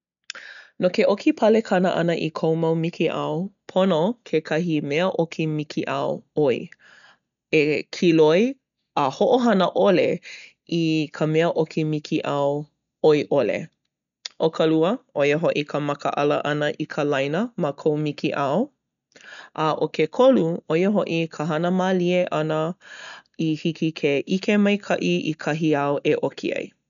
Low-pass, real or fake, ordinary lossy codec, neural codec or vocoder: 7.2 kHz; real; none; none